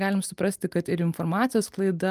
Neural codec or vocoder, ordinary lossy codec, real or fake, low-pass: none; Opus, 32 kbps; real; 14.4 kHz